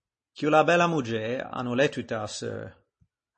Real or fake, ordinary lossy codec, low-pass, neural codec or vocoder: real; MP3, 32 kbps; 10.8 kHz; none